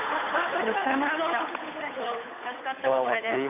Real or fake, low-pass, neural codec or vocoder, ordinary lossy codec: fake; 3.6 kHz; codec, 16 kHz, 8 kbps, FunCodec, trained on Chinese and English, 25 frames a second; none